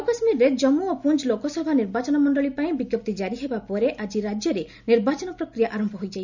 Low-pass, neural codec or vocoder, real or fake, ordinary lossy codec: 7.2 kHz; none; real; none